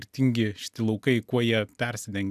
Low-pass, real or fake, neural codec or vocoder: 14.4 kHz; real; none